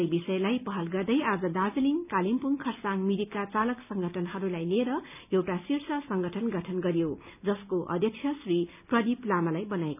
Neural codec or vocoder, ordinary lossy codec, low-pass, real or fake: none; none; 3.6 kHz; real